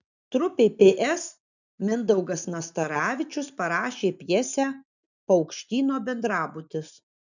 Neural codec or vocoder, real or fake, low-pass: none; real; 7.2 kHz